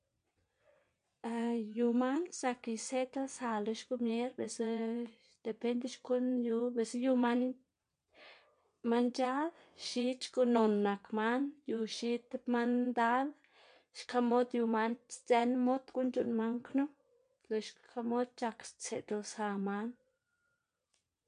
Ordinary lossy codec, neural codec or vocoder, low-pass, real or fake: MP3, 64 kbps; vocoder, 22.05 kHz, 80 mel bands, WaveNeXt; 9.9 kHz; fake